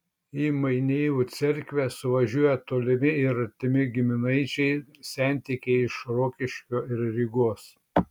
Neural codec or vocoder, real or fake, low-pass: none; real; 19.8 kHz